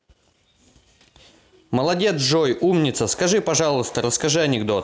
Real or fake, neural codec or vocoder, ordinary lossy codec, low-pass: real; none; none; none